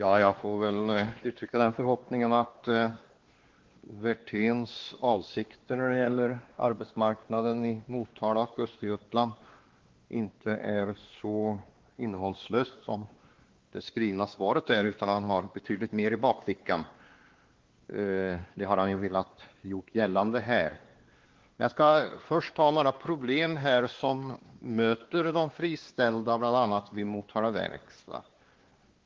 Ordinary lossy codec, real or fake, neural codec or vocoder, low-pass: Opus, 16 kbps; fake; codec, 16 kHz, 2 kbps, X-Codec, WavLM features, trained on Multilingual LibriSpeech; 7.2 kHz